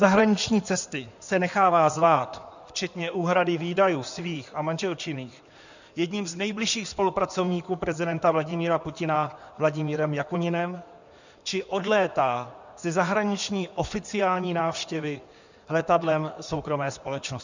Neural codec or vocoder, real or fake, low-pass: codec, 16 kHz in and 24 kHz out, 2.2 kbps, FireRedTTS-2 codec; fake; 7.2 kHz